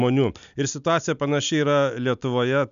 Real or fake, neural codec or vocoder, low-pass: real; none; 7.2 kHz